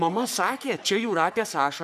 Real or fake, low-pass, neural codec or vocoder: fake; 14.4 kHz; codec, 44.1 kHz, 3.4 kbps, Pupu-Codec